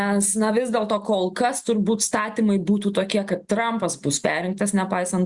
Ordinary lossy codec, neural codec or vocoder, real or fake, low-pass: Opus, 64 kbps; none; real; 10.8 kHz